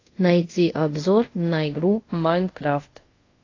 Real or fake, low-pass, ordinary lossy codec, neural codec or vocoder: fake; 7.2 kHz; AAC, 32 kbps; codec, 24 kHz, 0.5 kbps, DualCodec